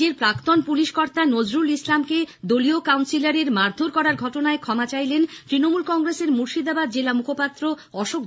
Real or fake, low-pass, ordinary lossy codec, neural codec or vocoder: real; none; none; none